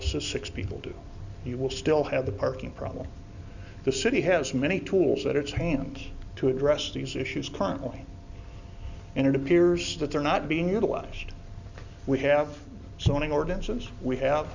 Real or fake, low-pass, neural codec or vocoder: real; 7.2 kHz; none